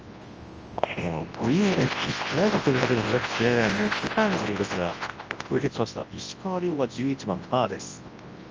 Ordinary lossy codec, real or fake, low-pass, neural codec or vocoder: Opus, 24 kbps; fake; 7.2 kHz; codec, 24 kHz, 0.9 kbps, WavTokenizer, large speech release